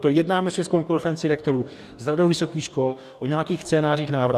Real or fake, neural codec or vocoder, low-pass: fake; codec, 44.1 kHz, 2.6 kbps, DAC; 14.4 kHz